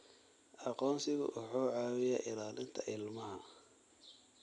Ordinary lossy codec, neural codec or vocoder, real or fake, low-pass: none; none; real; 10.8 kHz